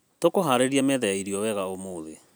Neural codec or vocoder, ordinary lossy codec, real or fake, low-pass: none; none; real; none